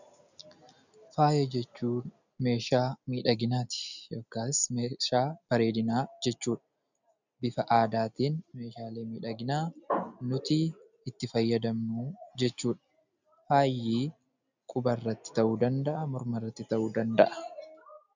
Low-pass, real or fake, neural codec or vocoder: 7.2 kHz; real; none